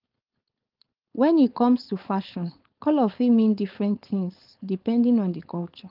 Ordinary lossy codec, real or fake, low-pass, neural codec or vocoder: Opus, 32 kbps; fake; 5.4 kHz; codec, 16 kHz, 4.8 kbps, FACodec